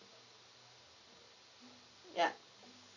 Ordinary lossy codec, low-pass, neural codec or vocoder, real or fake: none; 7.2 kHz; none; real